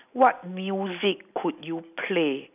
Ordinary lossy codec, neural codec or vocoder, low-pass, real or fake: none; none; 3.6 kHz; real